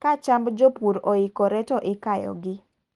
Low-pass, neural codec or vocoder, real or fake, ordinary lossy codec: 10.8 kHz; none; real; Opus, 24 kbps